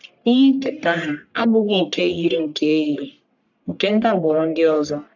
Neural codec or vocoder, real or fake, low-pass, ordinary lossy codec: codec, 44.1 kHz, 1.7 kbps, Pupu-Codec; fake; 7.2 kHz; none